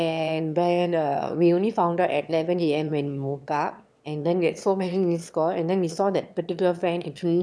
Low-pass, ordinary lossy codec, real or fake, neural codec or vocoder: none; none; fake; autoencoder, 22.05 kHz, a latent of 192 numbers a frame, VITS, trained on one speaker